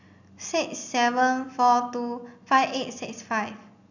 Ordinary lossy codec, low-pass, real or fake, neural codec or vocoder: none; 7.2 kHz; real; none